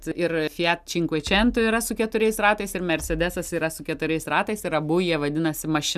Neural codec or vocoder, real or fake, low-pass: none; real; 14.4 kHz